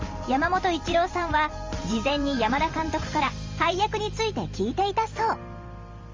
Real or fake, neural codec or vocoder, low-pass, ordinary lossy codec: fake; vocoder, 44.1 kHz, 128 mel bands every 512 samples, BigVGAN v2; 7.2 kHz; Opus, 32 kbps